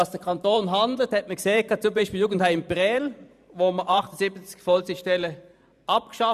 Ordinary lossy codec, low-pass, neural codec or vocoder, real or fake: none; 14.4 kHz; vocoder, 44.1 kHz, 128 mel bands every 512 samples, BigVGAN v2; fake